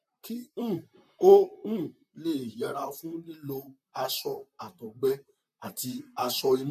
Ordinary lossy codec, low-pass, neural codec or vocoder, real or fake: MP3, 64 kbps; 14.4 kHz; vocoder, 44.1 kHz, 128 mel bands, Pupu-Vocoder; fake